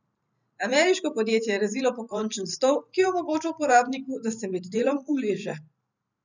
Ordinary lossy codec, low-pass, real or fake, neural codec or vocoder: none; 7.2 kHz; fake; vocoder, 44.1 kHz, 128 mel bands every 512 samples, BigVGAN v2